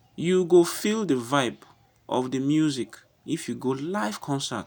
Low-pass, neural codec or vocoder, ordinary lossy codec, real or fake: none; none; none; real